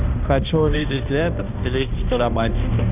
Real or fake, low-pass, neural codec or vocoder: fake; 3.6 kHz; codec, 16 kHz, 1 kbps, X-Codec, HuBERT features, trained on balanced general audio